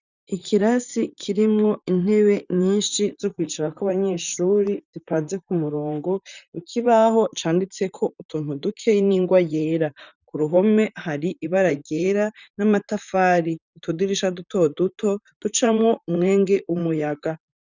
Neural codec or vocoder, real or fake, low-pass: vocoder, 44.1 kHz, 128 mel bands, Pupu-Vocoder; fake; 7.2 kHz